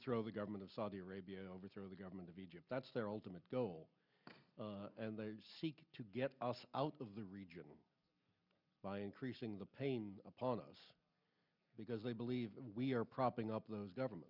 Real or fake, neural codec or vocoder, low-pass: real; none; 5.4 kHz